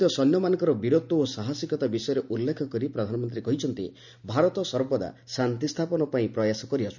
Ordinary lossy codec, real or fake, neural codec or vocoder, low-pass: none; real; none; 7.2 kHz